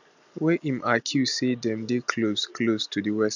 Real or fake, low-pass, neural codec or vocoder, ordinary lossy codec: real; 7.2 kHz; none; none